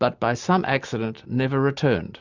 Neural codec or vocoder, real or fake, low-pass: none; real; 7.2 kHz